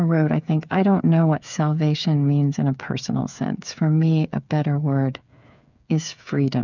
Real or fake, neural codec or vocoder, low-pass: fake; codec, 16 kHz, 8 kbps, FreqCodec, smaller model; 7.2 kHz